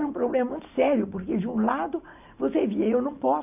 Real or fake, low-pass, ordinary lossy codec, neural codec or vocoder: real; 3.6 kHz; none; none